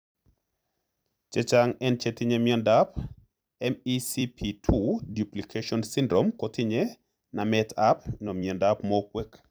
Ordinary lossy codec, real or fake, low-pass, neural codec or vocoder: none; fake; none; vocoder, 44.1 kHz, 128 mel bands every 256 samples, BigVGAN v2